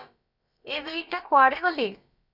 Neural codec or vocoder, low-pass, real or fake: codec, 16 kHz, about 1 kbps, DyCAST, with the encoder's durations; 5.4 kHz; fake